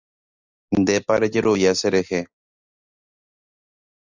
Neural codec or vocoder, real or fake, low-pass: none; real; 7.2 kHz